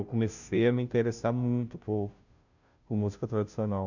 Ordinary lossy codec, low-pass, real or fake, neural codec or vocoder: none; 7.2 kHz; fake; codec, 16 kHz, 0.5 kbps, FunCodec, trained on Chinese and English, 25 frames a second